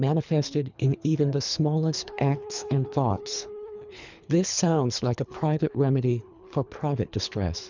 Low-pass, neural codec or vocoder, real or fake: 7.2 kHz; codec, 24 kHz, 3 kbps, HILCodec; fake